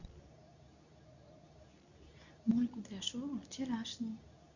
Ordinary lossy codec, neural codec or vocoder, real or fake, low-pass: none; codec, 24 kHz, 0.9 kbps, WavTokenizer, medium speech release version 2; fake; 7.2 kHz